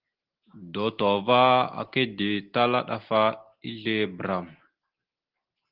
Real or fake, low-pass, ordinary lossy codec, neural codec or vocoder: real; 5.4 kHz; Opus, 16 kbps; none